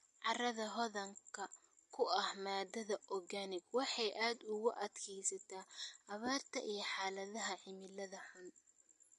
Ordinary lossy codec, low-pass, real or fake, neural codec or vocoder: MP3, 48 kbps; 14.4 kHz; real; none